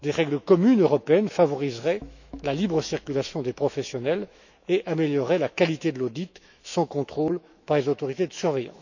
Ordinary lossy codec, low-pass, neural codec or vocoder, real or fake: none; 7.2 kHz; autoencoder, 48 kHz, 128 numbers a frame, DAC-VAE, trained on Japanese speech; fake